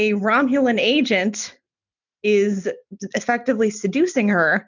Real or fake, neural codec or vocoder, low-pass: real; none; 7.2 kHz